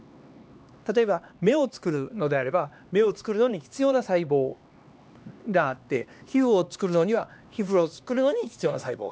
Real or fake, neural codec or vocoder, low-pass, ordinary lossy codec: fake; codec, 16 kHz, 2 kbps, X-Codec, HuBERT features, trained on LibriSpeech; none; none